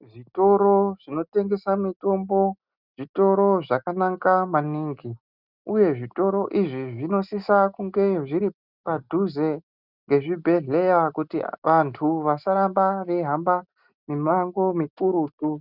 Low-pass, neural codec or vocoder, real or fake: 5.4 kHz; none; real